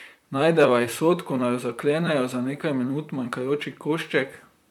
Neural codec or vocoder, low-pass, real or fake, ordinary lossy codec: vocoder, 44.1 kHz, 128 mel bands, Pupu-Vocoder; 19.8 kHz; fake; none